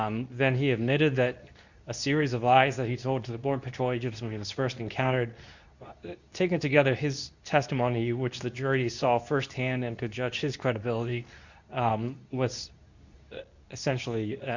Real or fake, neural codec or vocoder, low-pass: fake; codec, 24 kHz, 0.9 kbps, WavTokenizer, medium speech release version 2; 7.2 kHz